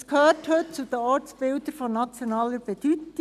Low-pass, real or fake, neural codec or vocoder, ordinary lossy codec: 14.4 kHz; real; none; none